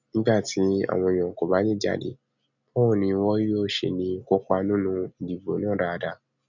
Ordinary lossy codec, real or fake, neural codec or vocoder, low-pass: none; real; none; 7.2 kHz